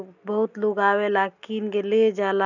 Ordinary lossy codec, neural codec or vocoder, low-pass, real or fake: none; none; 7.2 kHz; real